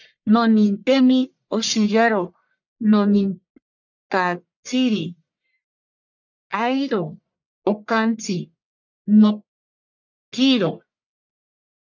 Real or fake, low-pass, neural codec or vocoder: fake; 7.2 kHz; codec, 44.1 kHz, 1.7 kbps, Pupu-Codec